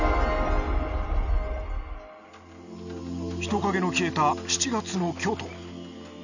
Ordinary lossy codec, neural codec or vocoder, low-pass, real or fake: none; none; 7.2 kHz; real